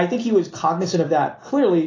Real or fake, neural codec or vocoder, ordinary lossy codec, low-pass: real; none; AAC, 32 kbps; 7.2 kHz